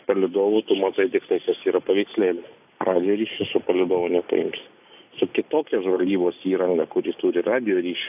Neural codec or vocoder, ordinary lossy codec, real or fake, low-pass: none; AAC, 32 kbps; real; 3.6 kHz